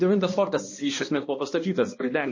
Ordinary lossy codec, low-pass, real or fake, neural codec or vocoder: MP3, 32 kbps; 7.2 kHz; fake; codec, 16 kHz, 1 kbps, X-Codec, HuBERT features, trained on balanced general audio